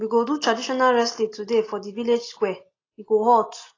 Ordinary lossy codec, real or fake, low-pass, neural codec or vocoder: AAC, 32 kbps; real; 7.2 kHz; none